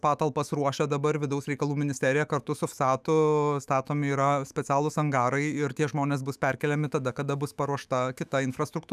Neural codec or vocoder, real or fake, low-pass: autoencoder, 48 kHz, 128 numbers a frame, DAC-VAE, trained on Japanese speech; fake; 14.4 kHz